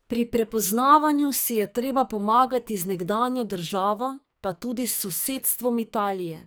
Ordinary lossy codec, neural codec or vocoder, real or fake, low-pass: none; codec, 44.1 kHz, 2.6 kbps, SNAC; fake; none